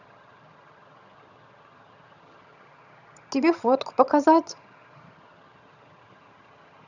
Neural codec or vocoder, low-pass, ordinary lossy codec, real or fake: vocoder, 22.05 kHz, 80 mel bands, HiFi-GAN; 7.2 kHz; none; fake